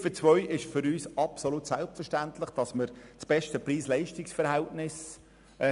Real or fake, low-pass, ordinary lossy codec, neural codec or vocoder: real; 10.8 kHz; none; none